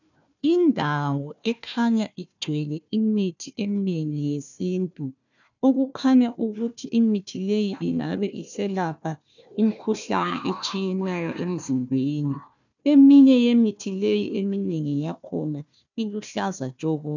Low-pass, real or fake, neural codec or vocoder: 7.2 kHz; fake; codec, 16 kHz, 1 kbps, FunCodec, trained on Chinese and English, 50 frames a second